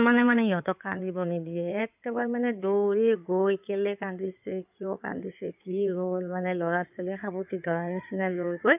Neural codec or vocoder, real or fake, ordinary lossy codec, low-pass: codec, 16 kHz in and 24 kHz out, 2.2 kbps, FireRedTTS-2 codec; fake; none; 3.6 kHz